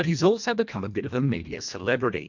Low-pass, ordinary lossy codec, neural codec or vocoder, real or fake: 7.2 kHz; MP3, 64 kbps; codec, 24 kHz, 1.5 kbps, HILCodec; fake